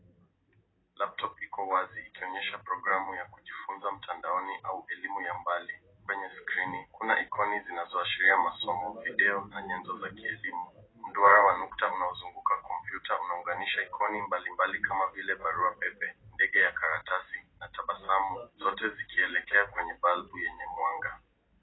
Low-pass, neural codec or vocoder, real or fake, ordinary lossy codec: 7.2 kHz; none; real; AAC, 16 kbps